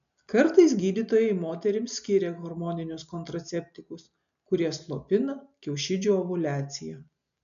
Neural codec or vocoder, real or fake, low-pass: none; real; 7.2 kHz